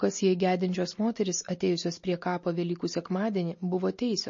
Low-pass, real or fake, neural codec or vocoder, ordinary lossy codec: 7.2 kHz; real; none; MP3, 32 kbps